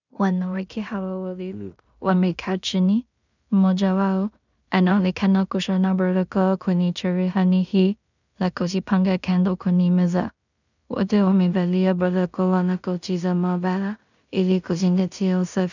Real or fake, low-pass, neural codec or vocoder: fake; 7.2 kHz; codec, 16 kHz in and 24 kHz out, 0.4 kbps, LongCat-Audio-Codec, two codebook decoder